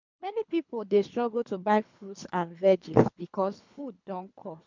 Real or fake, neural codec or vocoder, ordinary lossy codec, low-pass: fake; codec, 24 kHz, 3 kbps, HILCodec; MP3, 64 kbps; 7.2 kHz